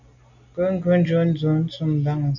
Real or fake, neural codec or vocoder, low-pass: real; none; 7.2 kHz